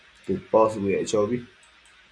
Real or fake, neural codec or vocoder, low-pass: real; none; 9.9 kHz